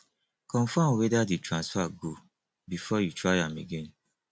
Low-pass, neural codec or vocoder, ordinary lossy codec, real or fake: none; none; none; real